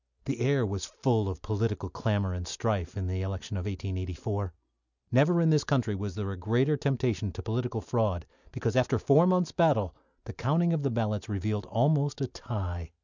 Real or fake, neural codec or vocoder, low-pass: real; none; 7.2 kHz